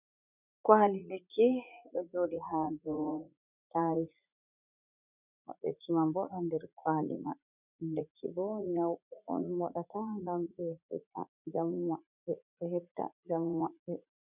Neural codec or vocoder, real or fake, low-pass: vocoder, 44.1 kHz, 80 mel bands, Vocos; fake; 3.6 kHz